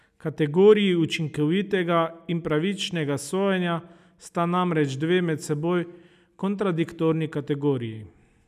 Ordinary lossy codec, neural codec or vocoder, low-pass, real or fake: none; none; 14.4 kHz; real